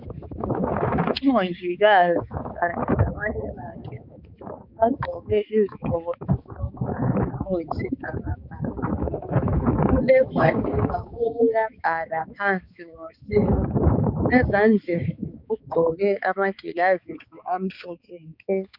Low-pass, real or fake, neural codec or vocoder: 5.4 kHz; fake; codec, 16 kHz, 4 kbps, X-Codec, HuBERT features, trained on balanced general audio